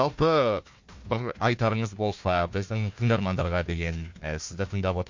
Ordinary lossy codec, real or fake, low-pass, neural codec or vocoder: MP3, 48 kbps; fake; 7.2 kHz; codec, 16 kHz, 1 kbps, FunCodec, trained on Chinese and English, 50 frames a second